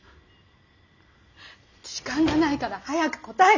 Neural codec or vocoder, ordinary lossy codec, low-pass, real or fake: none; none; 7.2 kHz; real